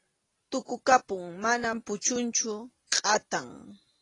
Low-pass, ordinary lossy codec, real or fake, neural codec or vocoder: 10.8 kHz; AAC, 32 kbps; real; none